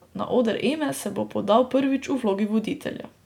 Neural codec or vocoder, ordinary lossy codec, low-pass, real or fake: none; none; 19.8 kHz; real